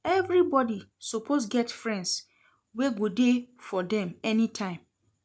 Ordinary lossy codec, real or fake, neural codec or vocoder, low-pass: none; real; none; none